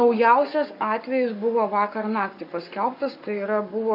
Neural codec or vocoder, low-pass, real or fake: codec, 44.1 kHz, 7.8 kbps, Pupu-Codec; 5.4 kHz; fake